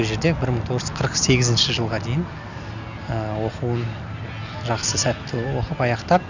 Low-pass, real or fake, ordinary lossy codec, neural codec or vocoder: 7.2 kHz; real; none; none